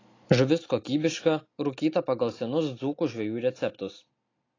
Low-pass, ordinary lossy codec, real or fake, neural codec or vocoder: 7.2 kHz; AAC, 32 kbps; real; none